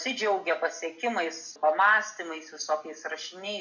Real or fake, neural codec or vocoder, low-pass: real; none; 7.2 kHz